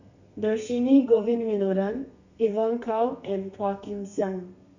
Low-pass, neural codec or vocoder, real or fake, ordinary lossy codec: 7.2 kHz; codec, 32 kHz, 1.9 kbps, SNAC; fake; none